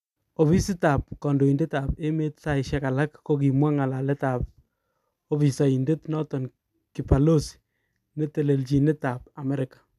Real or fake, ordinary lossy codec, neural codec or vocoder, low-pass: real; none; none; 14.4 kHz